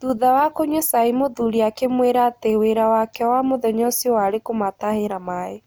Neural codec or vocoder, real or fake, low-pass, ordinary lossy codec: none; real; none; none